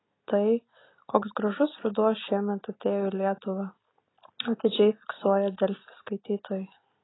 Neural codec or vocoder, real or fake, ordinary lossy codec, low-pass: none; real; AAC, 16 kbps; 7.2 kHz